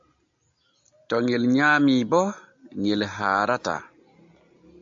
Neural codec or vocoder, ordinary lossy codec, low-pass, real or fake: none; AAC, 64 kbps; 7.2 kHz; real